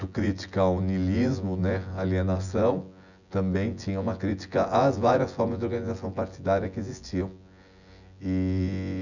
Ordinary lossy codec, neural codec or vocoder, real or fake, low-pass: none; vocoder, 24 kHz, 100 mel bands, Vocos; fake; 7.2 kHz